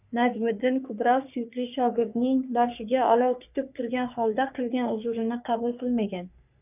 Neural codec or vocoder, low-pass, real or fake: codec, 44.1 kHz, 3.4 kbps, Pupu-Codec; 3.6 kHz; fake